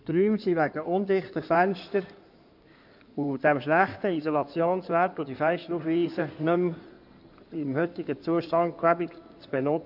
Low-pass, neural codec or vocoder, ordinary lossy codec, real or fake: 5.4 kHz; codec, 16 kHz in and 24 kHz out, 2.2 kbps, FireRedTTS-2 codec; none; fake